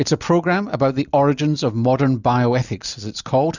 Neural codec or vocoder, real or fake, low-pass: none; real; 7.2 kHz